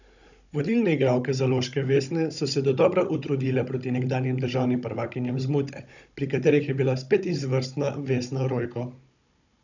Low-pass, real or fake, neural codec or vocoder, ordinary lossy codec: 7.2 kHz; fake; codec, 16 kHz, 16 kbps, FunCodec, trained on Chinese and English, 50 frames a second; none